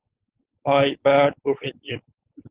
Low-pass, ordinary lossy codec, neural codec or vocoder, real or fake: 3.6 kHz; Opus, 16 kbps; codec, 16 kHz, 4.8 kbps, FACodec; fake